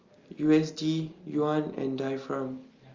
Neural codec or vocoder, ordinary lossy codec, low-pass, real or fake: none; Opus, 32 kbps; 7.2 kHz; real